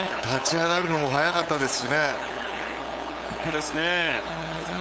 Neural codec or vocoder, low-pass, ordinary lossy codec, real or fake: codec, 16 kHz, 8 kbps, FunCodec, trained on LibriTTS, 25 frames a second; none; none; fake